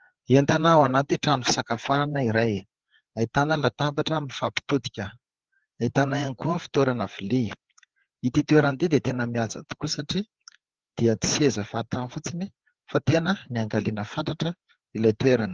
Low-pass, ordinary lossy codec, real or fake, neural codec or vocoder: 7.2 kHz; Opus, 16 kbps; fake; codec, 16 kHz, 4 kbps, FreqCodec, larger model